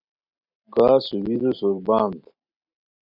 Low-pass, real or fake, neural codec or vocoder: 5.4 kHz; fake; vocoder, 44.1 kHz, 128 mel bands every 256 samples, BigVGAN v2